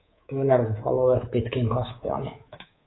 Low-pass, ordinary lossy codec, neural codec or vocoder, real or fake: 7.2 kHz; AAC, 16 kbps; codec, 24 kHz, 3.1 kbps, DualCodec; fake